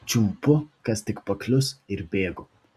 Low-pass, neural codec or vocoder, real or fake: 14.4 kHz; none; real